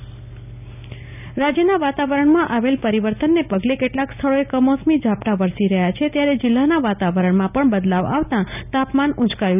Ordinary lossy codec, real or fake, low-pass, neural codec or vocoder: none; real; 3.6 kHz; none